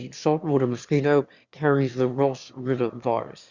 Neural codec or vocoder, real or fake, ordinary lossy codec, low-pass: autoencoder, 22.05 kHz, a latent of 192 numbers a frame, VITS, trained on one speaker; fake; Opus, 64 kbps; 7.2 kHz